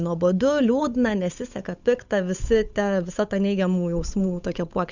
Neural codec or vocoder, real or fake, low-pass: codec, 16 kHz, 8 kbps, FunCodec, trained on Chinese and English, 25 frames a second; fake; 7.2 kHz